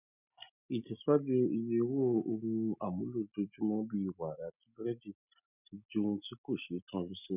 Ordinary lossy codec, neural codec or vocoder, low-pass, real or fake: none; none; 3.6 kHz; real